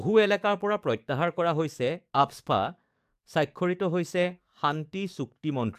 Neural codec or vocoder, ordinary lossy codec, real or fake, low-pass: codec, 44.1 kHz, 7.8 kbps, DAC; AAC, 96 kbps; fake; 14.4 kHz